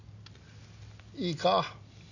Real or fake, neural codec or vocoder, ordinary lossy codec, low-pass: real; none; none; 7.2 kHz